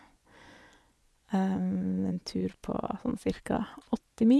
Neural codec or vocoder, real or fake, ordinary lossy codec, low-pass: vocoder, 24 kHz, 100 mel bands, Vocos; fake; none; none